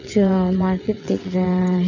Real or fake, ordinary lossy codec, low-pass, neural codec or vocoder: fake; none; 7.2 kHz; vocoder, 22.05 kHz, 80 mel bands, WaveNeXt